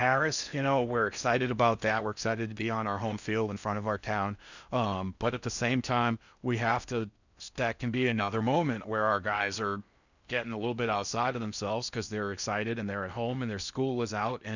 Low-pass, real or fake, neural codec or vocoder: 7.2 kHz; fake; codec, 16 kHz in and 24 kHz out, 0.6 kbps, FocalCodec, streaming, 4096 codes